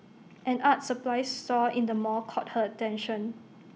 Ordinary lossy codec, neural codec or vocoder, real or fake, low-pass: none; none; real; none